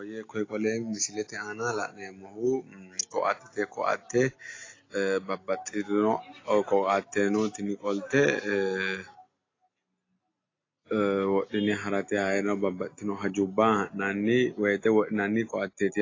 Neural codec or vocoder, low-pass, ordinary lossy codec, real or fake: none; 7.2 kHz; AAC, 32 kbps; real